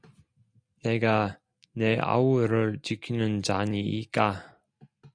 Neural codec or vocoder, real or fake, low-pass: none; real; 9.9 kHz